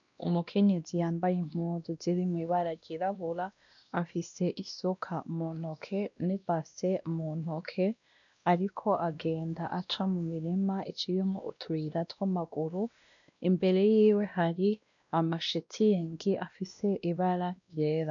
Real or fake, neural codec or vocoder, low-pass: fake; codec, 16 kHz, 1 kbps, X-Codec, WavLM features, trained on Multilingual LibriSpeech; 7.2 kHz